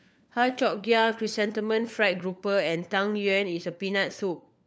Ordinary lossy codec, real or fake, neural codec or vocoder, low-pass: none; fake; codec, 16 kHz, 4 kbps, FunCodec, trained on LibriTTS, 50 frames a second; none